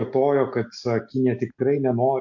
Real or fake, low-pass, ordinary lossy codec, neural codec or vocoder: real; 7.2 kHz; MP3, 48 kbps; none